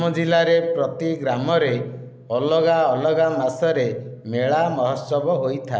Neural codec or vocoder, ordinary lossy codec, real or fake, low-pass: none; none; real; none